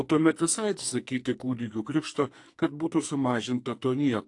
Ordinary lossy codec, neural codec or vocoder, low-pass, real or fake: AAC, 48 kbps; codec, 44.1 kHz, 2.6 kbps, SNAC; 10.8 kHz; fake